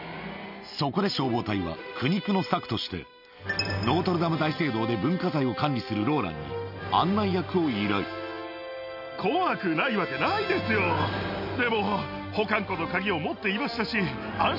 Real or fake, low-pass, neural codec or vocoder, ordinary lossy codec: real; 5.4 kHz; none; none